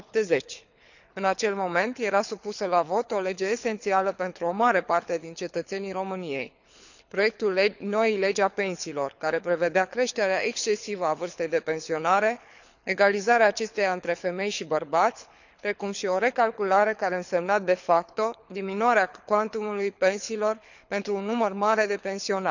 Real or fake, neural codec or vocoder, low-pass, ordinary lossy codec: fake; codec, 24 kHz, 6 kbps, HILCodec; 7.2 kHz; none